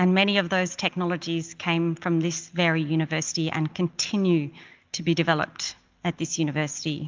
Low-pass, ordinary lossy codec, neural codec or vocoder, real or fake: 7.2 kHz; Opus, 24 kbps; none; real